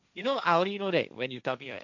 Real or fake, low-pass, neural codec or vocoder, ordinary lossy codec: fake; 7.2 kHz; codec, 16 kHz, 1.1 kbps, Voila-Tokenizer; none